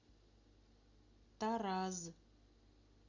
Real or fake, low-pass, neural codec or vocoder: real; 7.2 kHz; none